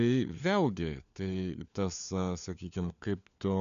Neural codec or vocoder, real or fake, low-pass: codec, 16 kHz, 4 kbps, FunCodec, trained on LibriTTS, 50 frames a second; fake; 7.2 kHz